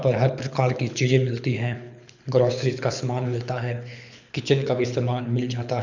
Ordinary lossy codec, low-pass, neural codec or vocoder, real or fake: none; 7.2 kHz; codec, 24 kHz, 6 kbps, HILCodec; fake